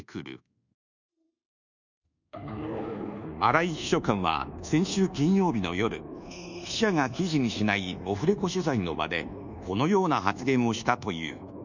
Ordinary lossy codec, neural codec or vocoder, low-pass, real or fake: none; codec, 24 kHz, 1.2 kbps, DualCodec; 7.2 kHz; fake